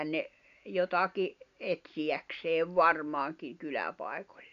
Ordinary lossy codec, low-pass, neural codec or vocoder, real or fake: none; 7.2 kHz; none; real